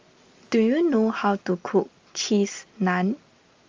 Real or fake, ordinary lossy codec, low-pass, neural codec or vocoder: real; Opus, 32 kbps; 7.2 kHz; none